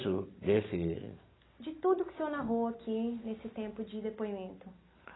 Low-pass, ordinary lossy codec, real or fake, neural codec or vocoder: 7.2 kHz; AAC, 16 kbps; real; none